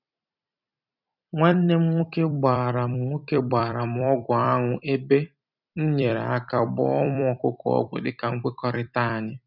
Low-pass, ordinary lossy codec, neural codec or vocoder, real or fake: 5.4 kHz; none; vocoder, 44.1 kHz, 128 mel bands every 256 samples, BigVGAN v2; fake